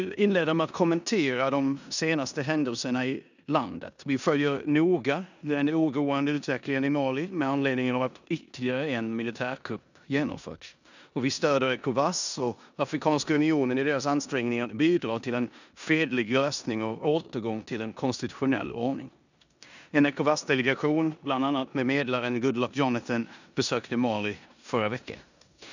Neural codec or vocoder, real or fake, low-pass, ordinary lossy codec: codec, 16 kHz in and 24 kHz out, 0.9 kbps, LongCat-Audio-Codec, four codebook decoder; fake; 7.2 kHz; none